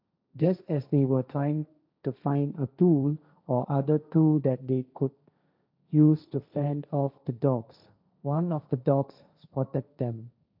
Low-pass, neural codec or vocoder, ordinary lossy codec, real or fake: 5.4 kHz; codec, 16 kHz, 1.1 kbps, Voila-Tokenizer; none; fake